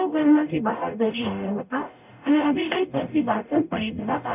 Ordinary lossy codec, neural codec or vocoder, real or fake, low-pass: none; codec, 44.1 kHz, 0.9 kbps, DAC; fake; 3.6 kHz